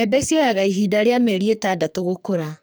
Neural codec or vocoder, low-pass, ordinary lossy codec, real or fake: codec, 44.1 kHz, 2.6 kbps, SNAC; none; none; fake